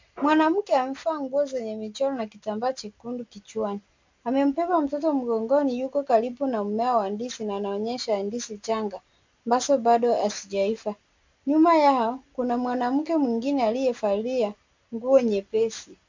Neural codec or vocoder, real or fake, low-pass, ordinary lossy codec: none; real; 7.2 kHz; MP3, 64 kbps